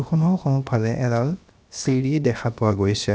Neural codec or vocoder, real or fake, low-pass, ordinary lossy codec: codec, 16 kHz, 0.7 kbps, FocalCodec; fake; none; none